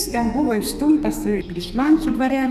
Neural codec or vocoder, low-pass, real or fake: codec, 32 kHz, 1.9 kbps, SNAC; 14.4 kHz; fake